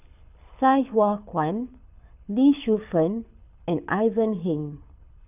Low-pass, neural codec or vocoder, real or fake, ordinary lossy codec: 3.6 kHz; codec, 24 kHz, 6 kbps, HILCodec; fake; none